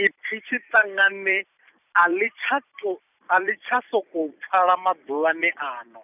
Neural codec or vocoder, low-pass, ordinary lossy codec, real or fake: vocoder, 44.1 kHz, 128 mel bands every 256 samples, BigVGAN v2; 3.6 kHz; none; fake